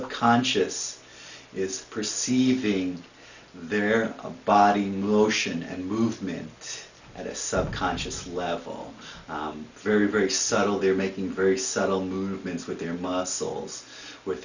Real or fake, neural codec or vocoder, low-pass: real; none; 7.2 kHz